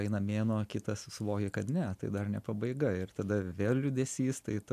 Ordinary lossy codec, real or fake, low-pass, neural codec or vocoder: AAC, 96 kbps; real; 14.4 kHz; none